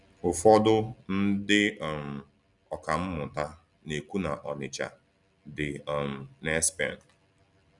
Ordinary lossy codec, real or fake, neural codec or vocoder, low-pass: none; real; none; 10.8 kHz